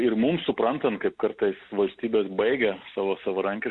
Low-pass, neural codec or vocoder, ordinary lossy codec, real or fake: 10.8 kHz; none; Opus, 64 kbps; real